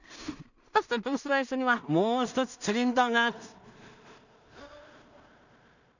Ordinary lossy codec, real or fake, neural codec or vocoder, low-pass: none; fake; codec, 16 kHz in and 24 kHz out, 0.4 kbps, LongCat-Audio-Codec, two codebook decoder; 7.2 kHz